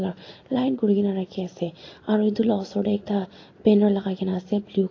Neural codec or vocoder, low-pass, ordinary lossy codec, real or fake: none; 7.2 kHz; AAC, 32 kbps; real